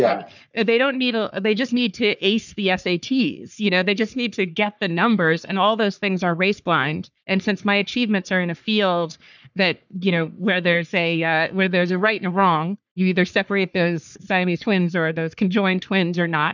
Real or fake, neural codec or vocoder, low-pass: fake; codec, 44.1 kHz, 3.4 kbps, Pupu-Codec; 7.2 kHz